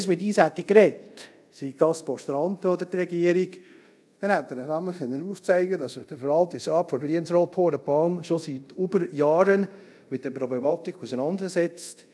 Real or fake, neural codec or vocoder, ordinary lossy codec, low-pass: fake; codec, 24 kHz, 0.5 kbps, DualCodec; none; none